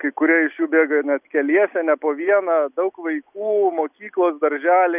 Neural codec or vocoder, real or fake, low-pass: none; real; 3.6 kHz